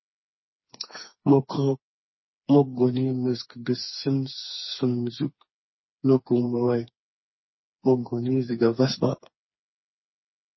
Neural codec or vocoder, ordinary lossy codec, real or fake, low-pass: codec, 16 kHz, 4 kbps, FreqCodec, smaller model; MP3, 24 kbps; fake; 7.2 kHz